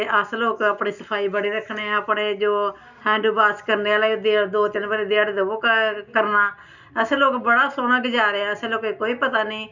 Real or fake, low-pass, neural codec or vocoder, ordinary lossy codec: real; 7.2 kHz; none; none